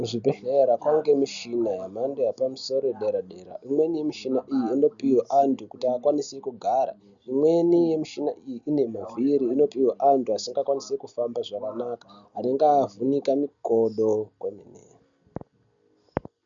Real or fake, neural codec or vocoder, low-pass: real; none; 7.2 kHz